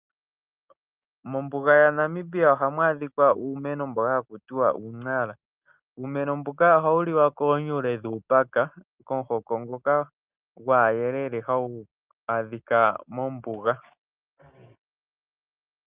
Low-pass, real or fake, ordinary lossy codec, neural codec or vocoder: 3.6 kHz; real; Opus, 24 kbps; none